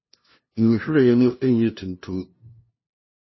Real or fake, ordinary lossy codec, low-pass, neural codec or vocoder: fake; MP3, 24 kbps; 7.2 kHz; codec, 16 kHz, 0.5 kbps, FunCodec, trained on LibriTTS, 25 frames a second